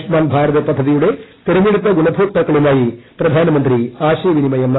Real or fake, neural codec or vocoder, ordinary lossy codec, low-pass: real; none; AAC, 16 kbps; 7.2 kHz